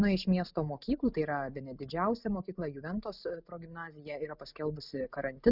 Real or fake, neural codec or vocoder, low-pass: real; none; 5.4 kHz